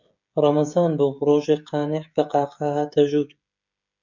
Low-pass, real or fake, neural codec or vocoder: 7.2 kHz; fake; codec, 16 kHz, 16 kbps, FreqCodec, smaller model